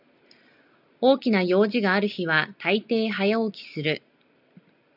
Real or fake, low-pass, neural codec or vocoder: real; 5.4 kHz; none